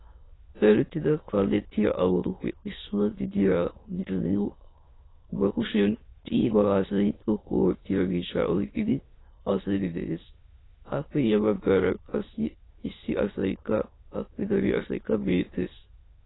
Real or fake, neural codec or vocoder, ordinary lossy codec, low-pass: fake; autoencoder, 22.05 kHz, a latent of 192 numbers a frame, VITS, trained on many speakers; AAC, 16 kbps; 7.2 kHz